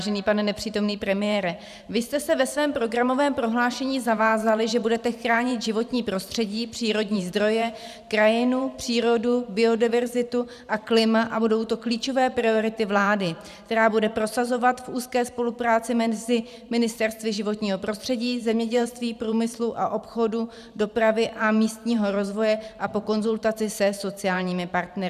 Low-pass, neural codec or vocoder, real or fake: 14.4 kHz; vocoder, 44.1 kHz, 128 mel bands every 512 samples, BigVGAN v2; fake